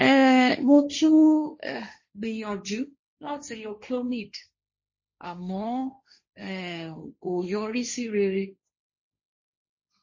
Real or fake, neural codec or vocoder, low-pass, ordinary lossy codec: fake; codec, 16 kHz, 1.1 kbps, Voila-Tokenizer; 7.2 kHz; MP3, 32 kbps